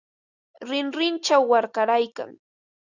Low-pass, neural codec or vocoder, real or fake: 7.2 kHz; none; real